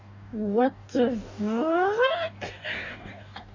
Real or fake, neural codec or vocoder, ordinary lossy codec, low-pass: fake; codec, 44.1 kHz, 2.6 kbps, DAC; none; 7.2 kHz